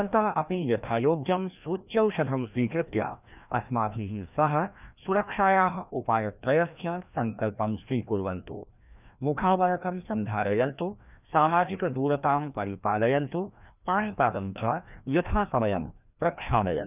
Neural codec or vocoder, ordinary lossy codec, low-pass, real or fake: codec, 16 kHz, 1 kbps, FreqCodec, larger model; none; 3.6 kHz; fake